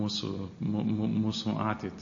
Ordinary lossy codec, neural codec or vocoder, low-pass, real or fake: MP3, 32 kbps; none; 7.2 kHz; real